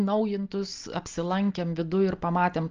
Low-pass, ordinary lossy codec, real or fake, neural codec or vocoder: 7.2 kHz; Opus, 24 kbps; real; none